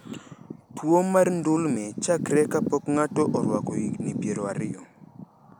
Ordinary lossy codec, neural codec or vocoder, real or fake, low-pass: none; none; real; none